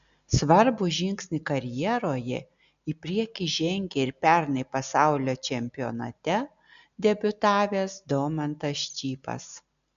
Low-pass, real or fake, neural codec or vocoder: 7.2 kHz; real; none